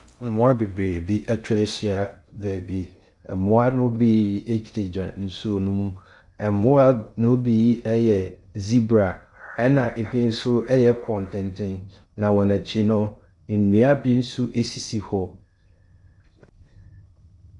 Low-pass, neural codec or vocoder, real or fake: 10.8 kHz; codec, 16 kHz in and 24 kHz out, 0.6 kbps, FocalCodec, streaming, 2048 codes; fake